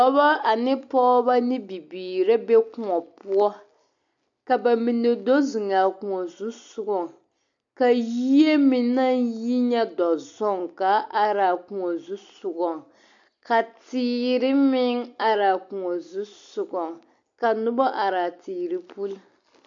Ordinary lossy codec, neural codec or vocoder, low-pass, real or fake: AAC, 64 kbps; none; 7.2 kHz; real